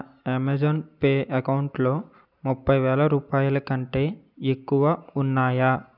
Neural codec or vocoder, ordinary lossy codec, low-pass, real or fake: none; none; 5.4 kHz; real